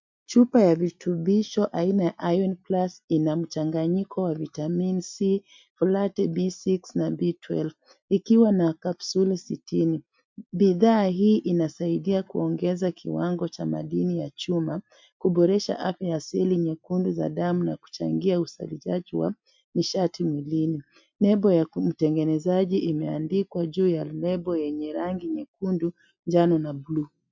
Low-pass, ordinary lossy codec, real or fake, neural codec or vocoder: 7.2 kHz; MP3, 64 kbps; real; none